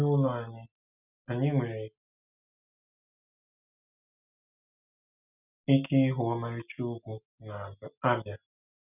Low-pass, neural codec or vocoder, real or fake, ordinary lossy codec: 3.6 kHz; none; real; none